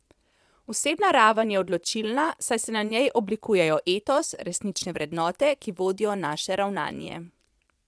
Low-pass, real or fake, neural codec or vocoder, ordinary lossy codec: none; fake; vocoder, 22.05 kHz, 80 mel bands, Vocos; none